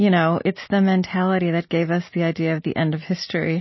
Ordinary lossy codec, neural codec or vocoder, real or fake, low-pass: MP3, 24 kbps; none; real; 7.2 kHz